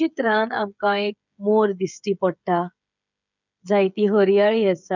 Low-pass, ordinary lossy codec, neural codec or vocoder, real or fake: 7.2 kHz; none; codec, 16 kHz, 16 kbps, FreqCodec, smaller model; fake